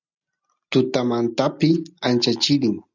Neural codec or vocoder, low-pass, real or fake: none; 7.2 kHz; real